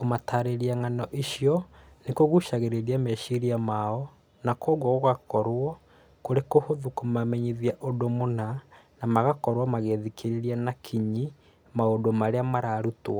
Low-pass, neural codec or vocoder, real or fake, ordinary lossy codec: none; none; real; none